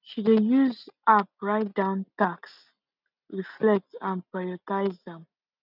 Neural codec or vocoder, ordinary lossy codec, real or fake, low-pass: none; none; real; 5.4 kHz